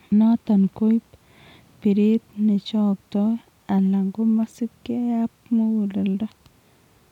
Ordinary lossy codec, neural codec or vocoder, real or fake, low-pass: none; none; real; 19.8 kHz